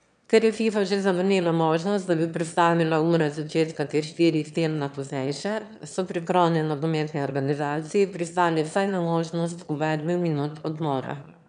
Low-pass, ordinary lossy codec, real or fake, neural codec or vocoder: 9.9 kHz; none; fake; autoencoder, 22.05 kHz, a latent of 192 numbers a frame, VITS, trained on one speaker